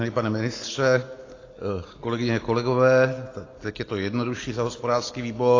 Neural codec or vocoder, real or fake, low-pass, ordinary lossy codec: none; real; 7.2 kHz; AAC, 32 kbps